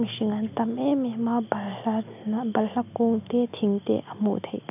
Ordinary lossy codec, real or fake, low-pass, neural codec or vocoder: none; real; 3.6 kHz; none